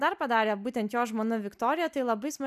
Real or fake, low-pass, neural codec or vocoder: real; 14.4 kHz; none